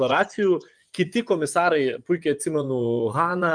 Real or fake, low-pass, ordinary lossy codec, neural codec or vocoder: fake; 9.9 kHz; Opus, 32 kbps; vocoder, 24 kHz, 100 mel bands, Vocos